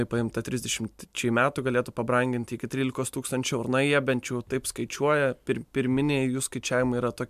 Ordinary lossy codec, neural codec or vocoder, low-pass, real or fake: MP3, 96 kbps; none; 14.4 kHz; real